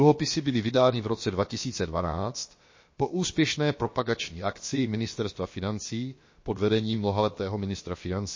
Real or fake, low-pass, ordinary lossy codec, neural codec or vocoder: fake; 7.2 kHz; MP3, 32 kbps; codec, 16 kHz, about 1 kbps, DyCAST, with the encoder's durations